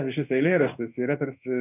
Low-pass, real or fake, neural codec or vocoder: 3.6 kHz; fake; codec, 16 kHz in and 24 kHz out, 1 kbps, XY-Tokenizer